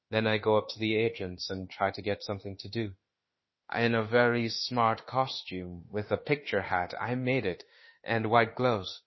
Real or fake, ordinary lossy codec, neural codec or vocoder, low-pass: fake; MP3, 24 kbps; autoencoder, 48 kHz, 32 numbers a frame, DAC-VAE, trained on Japanese speech; 7.2 kHz